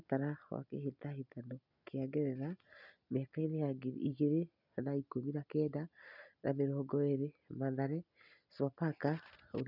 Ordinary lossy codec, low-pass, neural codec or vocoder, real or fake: none; 5.4 kHz; none; real